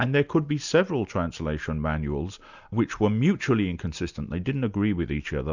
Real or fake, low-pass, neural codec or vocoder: real; 7.2 kHz; none